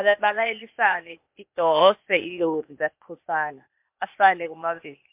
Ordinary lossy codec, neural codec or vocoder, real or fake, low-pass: MP3, 32 kbps; codec, 16 kHz, 0.8 kbps, ZipCodec; fake; 3.6 kHz